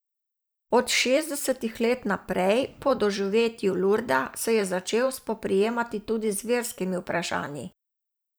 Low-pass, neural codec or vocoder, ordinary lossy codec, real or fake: none; vocoder, 44.1 kHz, 128 mel bands every 512 samples, BigVGAN v2; none; fake